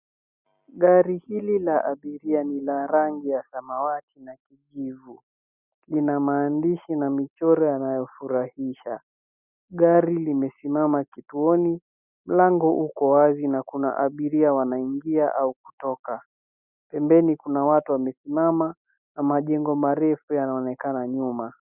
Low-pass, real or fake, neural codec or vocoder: 3.6 kHz; real; none